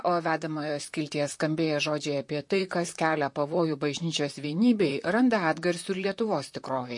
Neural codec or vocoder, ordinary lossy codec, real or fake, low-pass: vocoder, 44.1 kHz, 128 mel bands, Pupu-Vocoder; MP3, 48 kbps; fake; 10.8 kHz